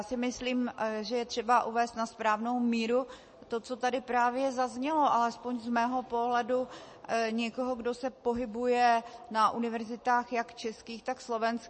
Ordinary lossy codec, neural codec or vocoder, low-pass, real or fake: MP3, 32 kbps; none; 9.9 kHz; real